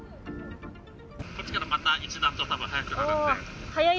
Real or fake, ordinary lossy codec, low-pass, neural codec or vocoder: real; none; none; none